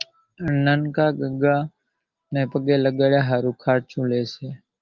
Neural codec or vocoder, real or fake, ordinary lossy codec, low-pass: none; real; Opus, 32 kbps; 7.2 kHz